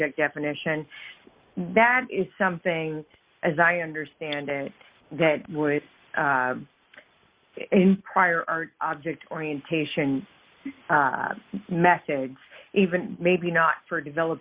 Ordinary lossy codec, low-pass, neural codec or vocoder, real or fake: Opus, 64 kbps; 3.6 kHz; none; real